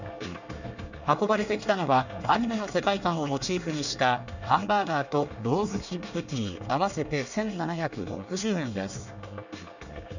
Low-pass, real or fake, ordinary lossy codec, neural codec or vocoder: 7.2 kHz; fake; none; codec, 24 kHz, 1 kbps, SNAC